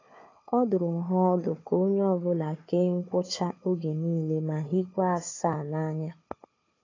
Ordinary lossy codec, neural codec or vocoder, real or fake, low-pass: AAC, 32 kbps; codec, 16 kHz, 4 kbps, FunCodec, trained on Chinese and English, 50 frames a second; fake; 7.2 kHz